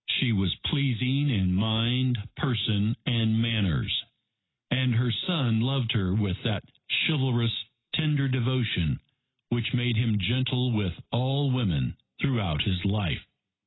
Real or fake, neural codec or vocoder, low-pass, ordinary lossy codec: real; none; 7.2 kHz; AAC, 16 kbps